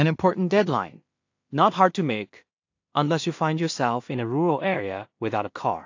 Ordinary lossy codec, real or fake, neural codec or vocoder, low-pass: AAC, 48 kbps; fake; codec, 16 kHz in and 24 kHz out, 0.4 kbps, LongCat-Audio-Codec, two codebook decoder; 7.2 kHz